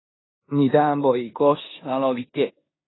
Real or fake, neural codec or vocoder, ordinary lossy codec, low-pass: fake; codec, 16 kHz in and 24 kHz out, 0.9 kbps, LongCat-Audio-Codec, four codebook decoder; AAC, 16 kbps; 7.2 kHz